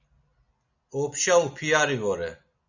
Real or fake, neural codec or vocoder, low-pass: real; none; 7.2 kHz